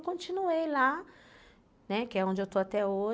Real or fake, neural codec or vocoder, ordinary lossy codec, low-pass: real; none; none; none